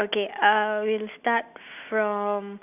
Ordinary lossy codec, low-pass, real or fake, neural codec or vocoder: none; 3.6 kHz; real; none